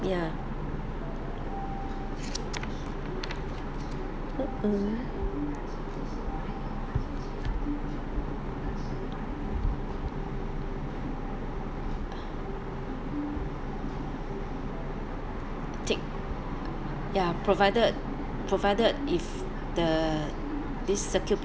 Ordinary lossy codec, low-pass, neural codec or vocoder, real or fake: none; none; none; real